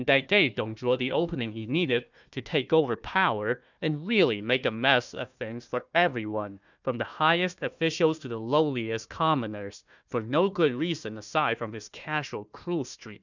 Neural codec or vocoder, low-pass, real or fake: codec, 16 kHz, 1 kbps, FunCodec, trained on Chinese and English, 50 frames a second; 7.2 kHz; fake